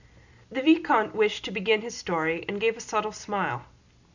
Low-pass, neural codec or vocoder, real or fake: 7.2 kHz; none; real